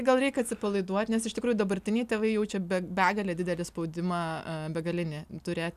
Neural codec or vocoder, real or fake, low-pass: none; real; 14.4 kHz